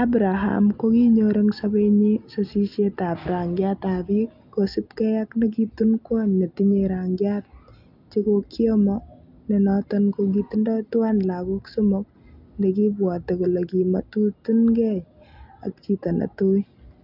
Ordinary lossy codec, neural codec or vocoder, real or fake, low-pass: none; none; real; 5.4 kHz